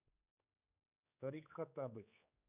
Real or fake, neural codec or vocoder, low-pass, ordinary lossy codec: fake; codec, 16 kHz, 4 kbps, X-Codec, HuBERT features, trained on general audio; 3.6 kHz; none